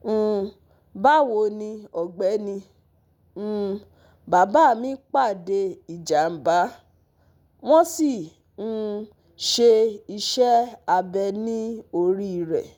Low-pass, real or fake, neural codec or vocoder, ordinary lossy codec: none; real; none; none